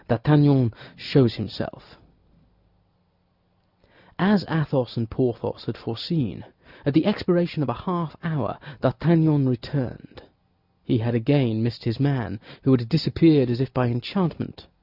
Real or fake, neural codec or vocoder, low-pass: real; none; 5.4 kHz